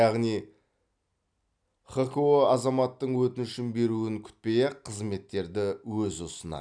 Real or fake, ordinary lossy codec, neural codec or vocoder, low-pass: real; none; none; 9.9 kHz